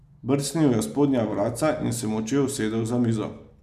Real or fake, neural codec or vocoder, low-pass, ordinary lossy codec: real; none; 14.4 kHz; none